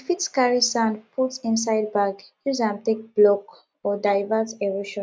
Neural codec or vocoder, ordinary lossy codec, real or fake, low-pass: none; none; real; none